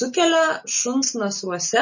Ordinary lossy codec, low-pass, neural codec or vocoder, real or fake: MP3, 32 kbps; 7.2 kHz; none; real